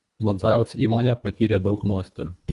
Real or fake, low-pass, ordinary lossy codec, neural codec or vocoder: fake; 10.8 kHz; Opus, 64 kbps; codec, 24 kHz, 1.5 kbps, HILCodec